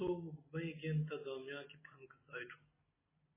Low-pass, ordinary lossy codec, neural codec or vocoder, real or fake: 3.6 kHz; MP3, 16 kbps; none; real